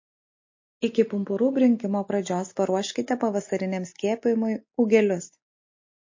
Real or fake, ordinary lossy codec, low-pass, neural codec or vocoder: real; MP3, 32 kbps; 7.2 kHz; none